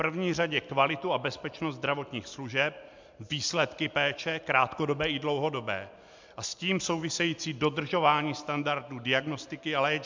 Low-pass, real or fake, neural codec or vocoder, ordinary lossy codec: 7.2 kHz; real; none; MP3, 64 kbps